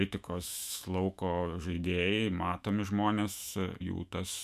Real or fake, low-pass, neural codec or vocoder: fake; 14.4 kHz; autoencoder, 48 kHz, 128 numbers a frame, DAC-VAE, trained on Japanese speech